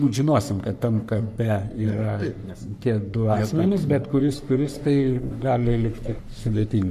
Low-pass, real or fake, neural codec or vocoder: 14.4 kHz; fake; codec, 44.1 kHz, 3.4 kbps, Pupu-Codec